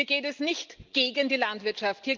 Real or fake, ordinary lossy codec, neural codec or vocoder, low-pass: real; Opus, 32 kbps; none; 7.2 kHz